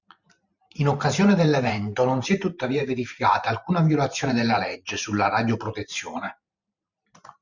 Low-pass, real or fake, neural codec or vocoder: 7.2 kHz; fake; vocoder, 44.1 kHz, 128 mel bands every 512 samples, BigVGAN v2